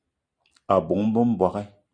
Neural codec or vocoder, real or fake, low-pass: none; real; 9.9 kHz